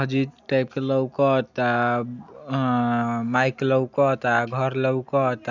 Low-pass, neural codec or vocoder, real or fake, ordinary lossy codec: 7.2 kHz; none; real; none